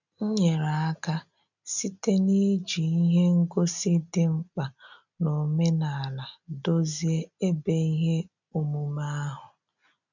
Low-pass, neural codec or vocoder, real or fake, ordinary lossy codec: 7.2 kHz; none; real; none